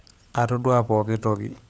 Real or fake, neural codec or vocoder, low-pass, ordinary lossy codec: fake; codec, 16 kHz, 16 kbps, FunCodec, trained on LibriTTS, 50 frames a second; none; none